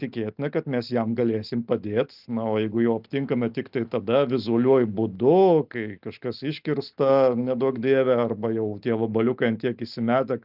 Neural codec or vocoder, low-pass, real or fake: none; 5.4 kHz; real